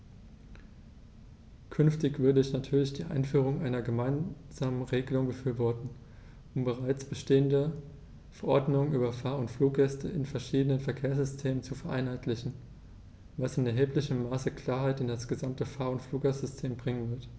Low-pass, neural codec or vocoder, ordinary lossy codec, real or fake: none; none; none; real